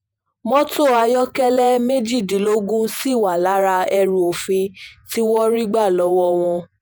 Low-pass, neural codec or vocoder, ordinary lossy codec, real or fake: none; vocoder, 48 kHz, 128 mel bands, Vocos; none; fake